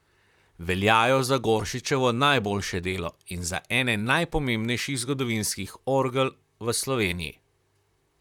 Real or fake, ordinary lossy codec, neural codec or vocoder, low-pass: fake; none; vocoder, 44.1 kHz, 128 mel bands, Pupu-Vocoder; 19.8 kHz